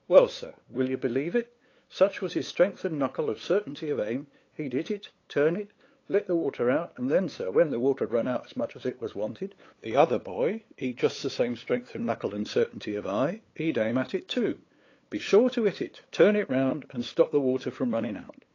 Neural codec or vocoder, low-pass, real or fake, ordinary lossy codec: codec, 16 kHz, 8 kbps, FunCodec, trained on LibriTTS, 25 frames a second; 7.2 kHz; fake; AAC, 32 kbps